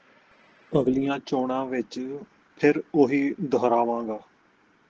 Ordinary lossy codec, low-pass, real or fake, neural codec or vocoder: Opus, 16 kbps; 7.2 kHz; real; none